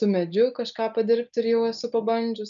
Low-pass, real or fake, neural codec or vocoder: 7.2 kHz; real; none